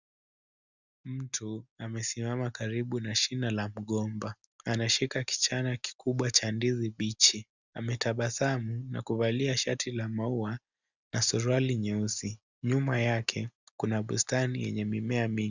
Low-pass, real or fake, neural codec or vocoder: 7.2 kHz; real; none